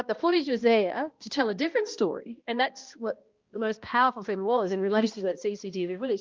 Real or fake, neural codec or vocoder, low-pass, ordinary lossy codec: fake; codec, 16 kHz, 1 kbps, X-Codec, HuBERT features, trained on balanced general audio; 7.2 kHz; Opus, 24 kbps